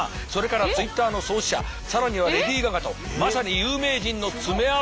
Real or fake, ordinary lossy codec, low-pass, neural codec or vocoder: real; none; none; none